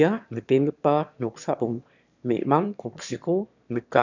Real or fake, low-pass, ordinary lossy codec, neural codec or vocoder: fake; 7.2 kHz; none; autoencoder, 22.05 kHz, a latent of 192 numbers a frame, VITS, trained on one speaker